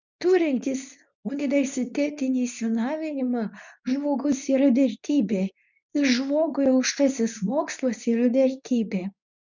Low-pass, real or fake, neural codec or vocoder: 7.2 kHz; fake; codec, 24 kHz, 0.9 kbps, WavTokenizer, medium speech release version 2